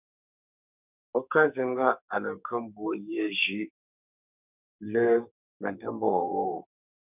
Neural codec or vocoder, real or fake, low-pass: codec, 44.1 kHz, 2.6 kbps, SNAC; fake; 3.6 kHz